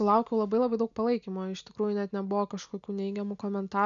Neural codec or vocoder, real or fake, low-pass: none; real; 7.2 kHz